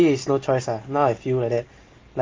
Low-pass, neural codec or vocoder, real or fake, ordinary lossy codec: 7.2 kHz; none; real; Opus, 24 kbps